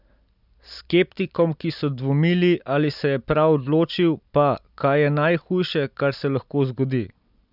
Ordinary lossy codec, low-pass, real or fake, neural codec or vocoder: none; 5.4 kHz; real; none